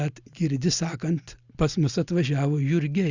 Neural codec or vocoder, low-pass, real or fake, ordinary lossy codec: none; 7.2 kHz; real; Opus, 64 kbps